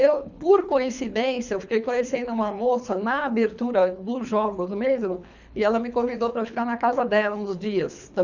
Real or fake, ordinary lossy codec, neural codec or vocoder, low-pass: fake; none; codec, 24 kHz, 3 kbps, HILCodec; 7.2 kHz